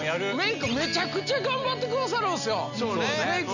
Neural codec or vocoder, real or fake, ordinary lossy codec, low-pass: none; real; none; 7.2 kHz